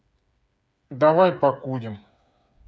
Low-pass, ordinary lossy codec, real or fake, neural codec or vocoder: none; none; fake; codec, 16 kHz, 8 kbps, FreqCodec, smaller model